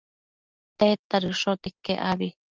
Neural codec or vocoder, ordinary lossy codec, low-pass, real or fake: none; Opus, 24 kbps; 7.2 kHz; real